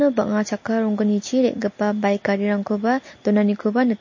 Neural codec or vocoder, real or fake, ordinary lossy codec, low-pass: none; real; MP3, 32 kbps; 7.2 kHz